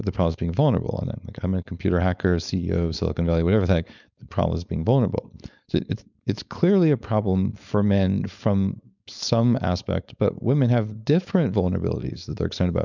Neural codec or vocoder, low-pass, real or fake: codec, 16 kHz, 4.8 kbps, FACodec; 7.2 kHz; fake